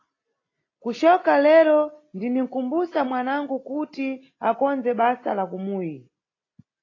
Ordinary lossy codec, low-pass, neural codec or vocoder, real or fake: AAC, 32 kbps; 7.2 kHz; none; real